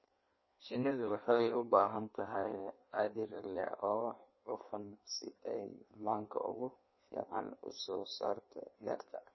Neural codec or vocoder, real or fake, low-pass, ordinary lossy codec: codec, 16 kHz in and 24 kHz out, 1.1 kbps, FireRedTTS-2 codec; fake; 7.2 kHz; MP3, 24 kbps